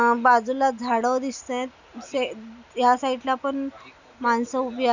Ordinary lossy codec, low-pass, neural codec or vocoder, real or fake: none; 7.2 kHz; none; real